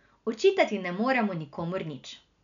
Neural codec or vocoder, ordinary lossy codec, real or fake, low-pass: none; none; real; 7.2 kHz